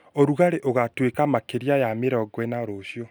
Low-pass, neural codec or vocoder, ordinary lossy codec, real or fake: none; none; none; real